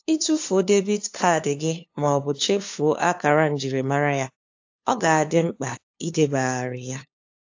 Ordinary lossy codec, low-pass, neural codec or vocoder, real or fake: AAC, 48 kbps; 7.2 kHz; codec, 16 kHz, 2 kbps, FunCodec, trained on LibriTTS, 25 frames a second; fake